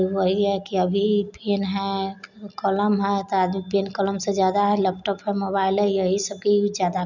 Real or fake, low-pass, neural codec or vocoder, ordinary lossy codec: real; 7.2 kHz; none; none